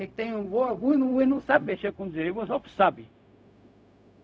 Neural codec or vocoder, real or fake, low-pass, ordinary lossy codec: codec, 16 kHz, 0.4 kbps, LongCat-Audio-Codec; fake; none; none